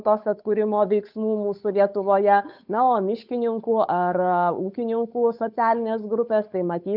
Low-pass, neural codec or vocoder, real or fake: 5.4 kHz; codec, 16 kHz, 8 kbps, FunCodec, trained on Chinese and English, 25 frames a second; fake